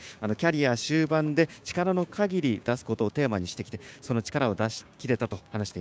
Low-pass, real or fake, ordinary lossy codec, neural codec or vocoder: none; fake; none; codec, 16 kHz, 6 kbps, DAC